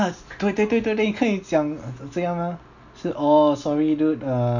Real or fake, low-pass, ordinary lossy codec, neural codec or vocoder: real; 7.2 kHz; none; none